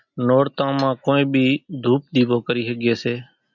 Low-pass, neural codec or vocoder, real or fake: 7.2 kHz; none; real